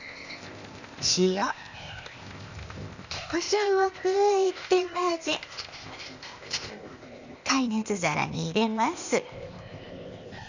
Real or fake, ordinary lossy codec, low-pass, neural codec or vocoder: fake; none; 7.2 kHz; codec, 16 kHz, 0.8 kbps, ZipCodec